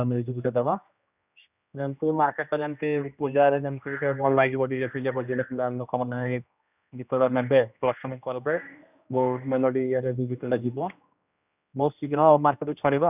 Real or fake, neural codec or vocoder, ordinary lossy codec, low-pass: fake; codec, 16 kHz, 1 kbps, X-Codec, HuBERT features, trained on general audio; none; 3.6 kHz